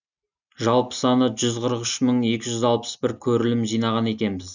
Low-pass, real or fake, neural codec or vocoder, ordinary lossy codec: 7.2 kHz; real; none; none